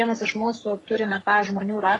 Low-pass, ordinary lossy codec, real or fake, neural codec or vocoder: 10.8 kHz; AAC, 32 kbps; fake; codec, 44.1 kHz, 3.4 kbps, Pupu-Codec